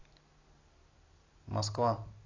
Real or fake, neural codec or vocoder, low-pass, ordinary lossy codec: real; none; 7.2 kHz; AAC, 32 kbps